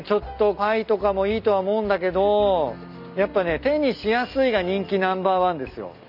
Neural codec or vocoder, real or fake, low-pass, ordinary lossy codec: none; real; 5.4 kHz; none